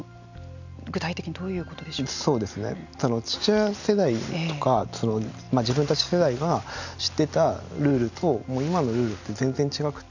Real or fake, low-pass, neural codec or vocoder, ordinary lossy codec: real; 7.2 kHz; none; none